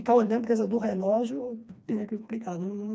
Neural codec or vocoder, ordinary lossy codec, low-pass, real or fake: codec, 16 kHz, 2 kbps, FreqCodec, smaller model; none; none; fake